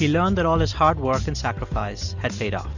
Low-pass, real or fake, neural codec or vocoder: 7.2 kHz; real; none